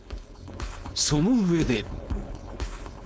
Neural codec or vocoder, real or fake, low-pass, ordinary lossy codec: codec, 16 kHz, 4.8 kbps, FACodec; fake; none; none